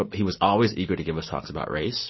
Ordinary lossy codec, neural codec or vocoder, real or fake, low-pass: MP3, 24 kbps; none; real; 7.2 kHz